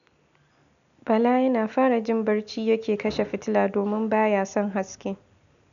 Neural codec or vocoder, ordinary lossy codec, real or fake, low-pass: none; none; real; 7.2 kHz